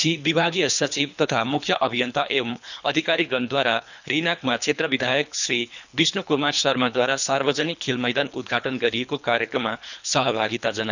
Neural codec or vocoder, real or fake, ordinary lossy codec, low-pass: codec, 24 kHz, 3 kbps, HILCodec; fake; none; 7.2 kHz